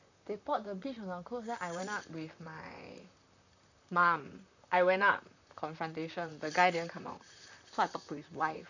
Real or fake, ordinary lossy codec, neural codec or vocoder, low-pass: fake; none; vocoder, 44.1 kHz, 128 mel bands, Pupu-Vocoder; 7.2 kHz